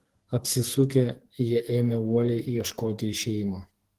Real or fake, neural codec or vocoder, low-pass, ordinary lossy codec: fake; codec, 44.1 kHz, 2.6 kbps, SNAC; 14.4 kHz; Opus, 16 kbps